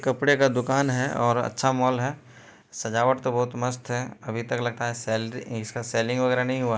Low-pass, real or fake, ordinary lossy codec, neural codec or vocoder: none; real; none; none